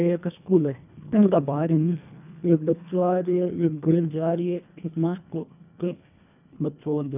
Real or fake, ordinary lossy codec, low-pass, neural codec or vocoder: fake; none; 3.6 kHz; codec, 24 kHz, 1.5 kbps, HILCodec